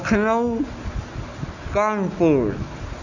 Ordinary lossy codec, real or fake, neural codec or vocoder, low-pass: none; real; none; 7.2 kHz